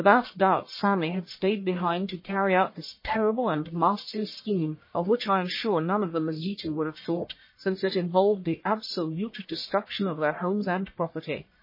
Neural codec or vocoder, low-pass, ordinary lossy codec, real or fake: codec, 44.1 kHz, 1.7 kbps, Pupu-Codec; 5.4 kHz; MP3, 24 kbps; fake